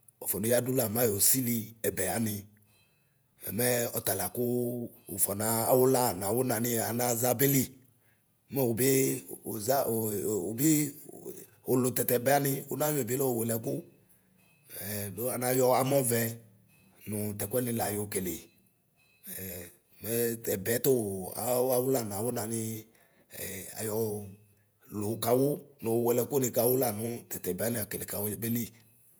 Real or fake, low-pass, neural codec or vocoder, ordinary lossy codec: fake; none; vocoder, 48 kHz, 128 mel bands, Vocos; none